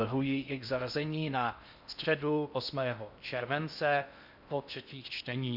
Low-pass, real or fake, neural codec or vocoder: 5.4 kHz; fake; codec, 16 kHz in and 24 kHz out, 0.6 kbps, FocalCodec, streaming, 4096 codes